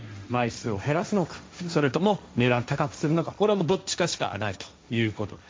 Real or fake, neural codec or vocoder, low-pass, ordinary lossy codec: fake; codec, 16 kHz, 1.1 kbps, Voila-Tokenizer; none; none